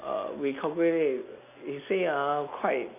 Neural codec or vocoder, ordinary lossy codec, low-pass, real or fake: none; none; 3.6 kHz; real